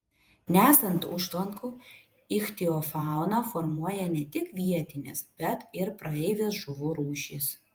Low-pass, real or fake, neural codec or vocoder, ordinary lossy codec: 19.8 kHz; fake; vocoder, 48 kHz, 128 mel bands, Vocos; Opus, 32 kbps